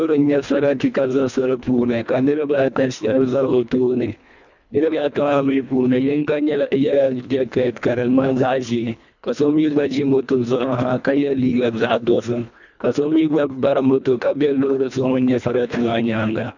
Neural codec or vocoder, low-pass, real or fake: codec, 24 kHz, 1.5 kbps, HILCodec; 7.2 kHz; fake